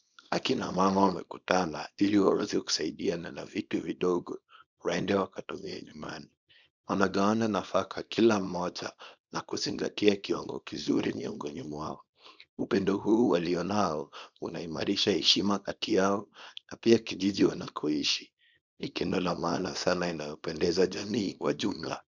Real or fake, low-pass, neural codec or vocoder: fake; 7.2 kHz; codec, 24 kHz, 0.9 kbps, WavTokenizer, small release